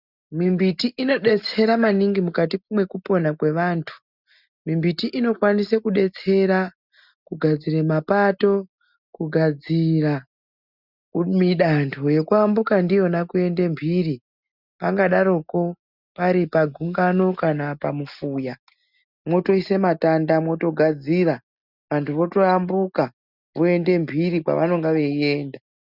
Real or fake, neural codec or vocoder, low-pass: real; none; 5.4 kHz